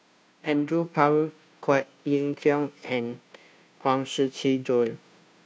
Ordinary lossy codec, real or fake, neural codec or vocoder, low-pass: none; fake; codec, 16 kHz, 0.5 kbps, FunCodec, trained on Chinese and English, 25 frames a second; none